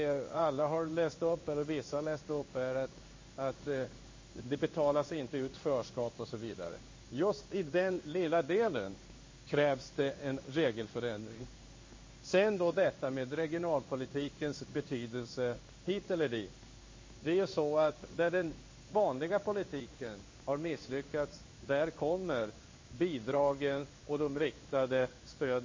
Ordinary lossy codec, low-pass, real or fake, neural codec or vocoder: MP3, 32 kbps; 7.2 kHz; fake; codec, 16 kHz in and 24 kHz out, 1 kbps, XY-Tokenizer